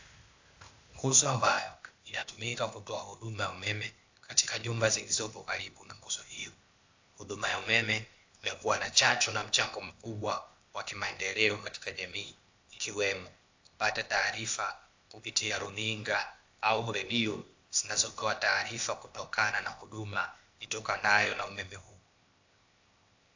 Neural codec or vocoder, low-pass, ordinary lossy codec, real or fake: codec, 16 kHz, 0.8 kbps, ZipCodec; 7.2 kHz; AAC, 48 kbps; fake